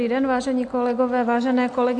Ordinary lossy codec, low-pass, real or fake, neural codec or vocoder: AAC, 64 kbps; 10.8 kHz; real; none